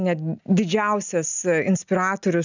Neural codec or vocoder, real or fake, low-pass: none; real; 7.2 kHz